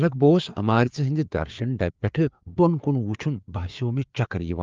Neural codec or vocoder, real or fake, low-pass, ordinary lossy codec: codec, 16 kHz, 4 kbps, FreqCodec, larger model; fake; 7.2 kHz; Opus, 32 kbps